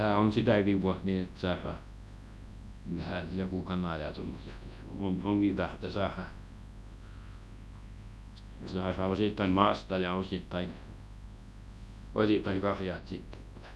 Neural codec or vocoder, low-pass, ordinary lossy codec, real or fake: codec, 24 kHz, 0.9 kbps, WavTokenizer, large speech release; none; none; fake